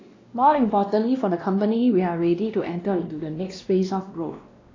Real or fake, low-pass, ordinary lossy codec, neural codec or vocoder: fake; 7.2 kHz; AAC, 32 kbps; codec, 16 kHz, 1 kbps, X-Codec, WavLM features, trained on Multilingual LibriSpeech